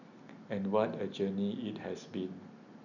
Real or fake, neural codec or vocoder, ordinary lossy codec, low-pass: real; none; none; 7.2 kHz